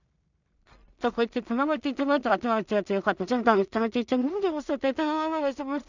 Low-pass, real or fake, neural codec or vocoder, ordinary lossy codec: 7.2 kHz; fake; codec, 16 kHz in and 24 kHz out, 0.4 kbps, LongCat-Audio-Codec, two codebook decoder; none